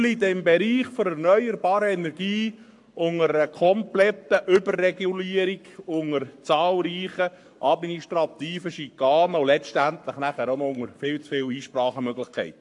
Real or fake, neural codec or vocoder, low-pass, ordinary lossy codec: fake; codec, 44.1 kHz, 7.8 kbps, Pupu-Codec; 10.8 kHz; AAC, 64 kbps